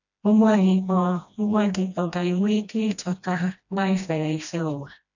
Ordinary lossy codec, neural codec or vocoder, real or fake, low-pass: none; codec, 16 kHz, 1 kbps, FreqCodec, smaller model; fake; 7.2 kHz